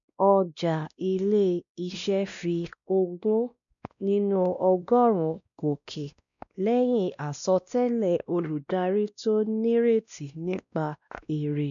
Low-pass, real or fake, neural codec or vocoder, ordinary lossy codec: 7.2 kHz; fake; codec, 16 kHz, 1 kbps, X-Codec, WavLM features, trained on Multilingual LibriSpeech; none